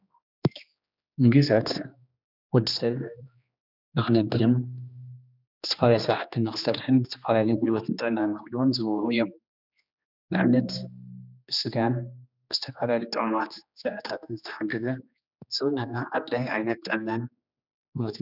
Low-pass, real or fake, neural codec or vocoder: 5.4 kHz; fake; codec, 16 kHz, 2 kbps, X-Codec, HuBERT features, trained on general audio